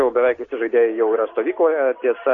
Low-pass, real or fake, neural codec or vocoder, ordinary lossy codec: 7.2 kHz; real; none; AAC, 32 kbps